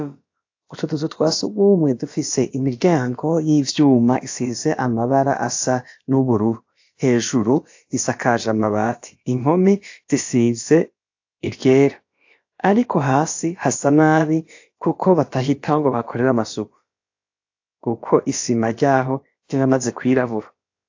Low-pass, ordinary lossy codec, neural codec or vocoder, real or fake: 7.2 kHz; AAC, 48 kbps; codec, 16 kHz, about 1 kbps, DyCAST, with the encoder's durations; fake